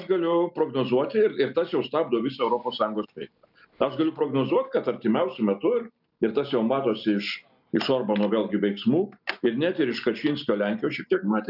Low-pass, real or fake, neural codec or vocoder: 5.4 kHz; real; none